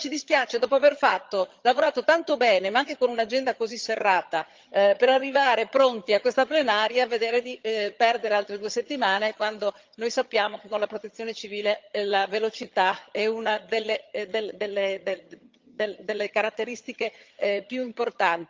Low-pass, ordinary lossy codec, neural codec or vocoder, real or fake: 7.2 kHz; Opus, 32 kbps; vocoder, 22.05 kHz, 80 mel bands, HiFi-GAN; fake